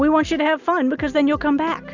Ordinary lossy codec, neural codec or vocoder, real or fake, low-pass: Opus, 64 kbps; none; real; 7.2 kHz